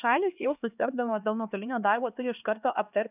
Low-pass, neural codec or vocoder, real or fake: 3.6 kHz; codec, 16 kHz, 2 kbps, X-Codec, HuBERT features, trained on LibriSpeech; fake